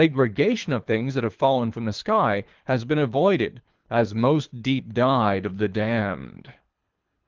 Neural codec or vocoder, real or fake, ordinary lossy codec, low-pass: codec, 24 kHz, 3 kbps, HILCodec; fake; Opus, 24 kbps; 7.2 kHz